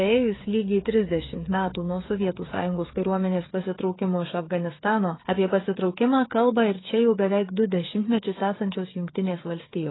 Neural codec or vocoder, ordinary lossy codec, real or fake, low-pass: codec, 16 kHz, 8 kbps, FreqCodec, smaller model; AAC, 16 kbps; fake; 7.2 kHz